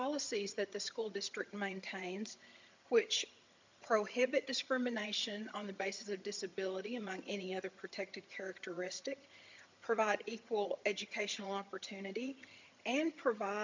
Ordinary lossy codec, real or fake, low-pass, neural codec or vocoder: MP3, 64 kbps; fake; 7.2 kHz; vocoder, 22.05 kHz, 80 mel bands, HiFi-GAN